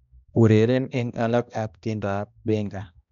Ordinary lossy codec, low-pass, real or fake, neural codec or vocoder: none; 7.2 kHz; fake; codec, 16 kHz, 1 kbps, X-Codec, HuBERT features, trained on balanced general audio